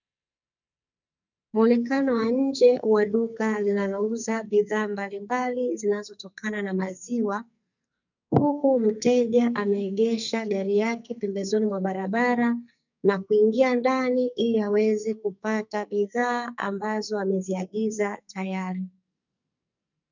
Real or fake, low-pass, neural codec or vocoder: fake; 7.2 kHz; codec, 44.1 kHz, 2.6 kbps, SNAC